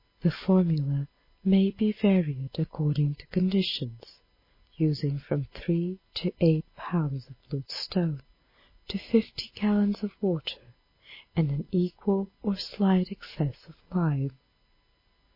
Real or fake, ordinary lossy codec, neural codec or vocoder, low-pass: real; MP3, 24 kbps; none; 5.4 kHz